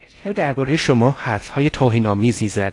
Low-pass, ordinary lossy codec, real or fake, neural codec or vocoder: 10.8 kHz; AAC, 48 kbps; fake; codec, 16 kHz in and 24 kHz out, 0.6 kbps, FocalCodec, streaming, 4096 codes